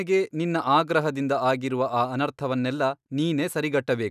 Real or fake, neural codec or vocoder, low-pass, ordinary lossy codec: real; none; 14.4 kHz; none